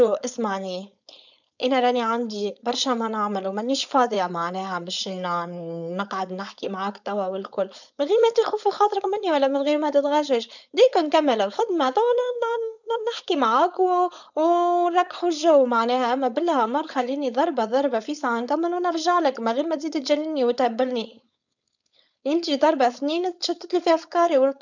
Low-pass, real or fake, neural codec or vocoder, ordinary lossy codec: 7.2 kHz; fake; codec, 16 kHz, 4.8 kbps, FACodec; none